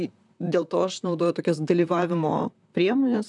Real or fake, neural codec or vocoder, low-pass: fake; vocoder, 44.1 kHz, 128 mel bands, Pupu-Vocoder; 10.8 kHz